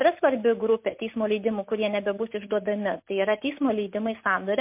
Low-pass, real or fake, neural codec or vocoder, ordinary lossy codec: 3.6 kHz; real; none; MP3, 32 kbps